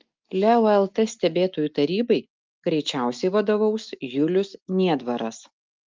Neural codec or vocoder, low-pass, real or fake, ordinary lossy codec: none; 7.2 kHz; real; Opus, 24 kbps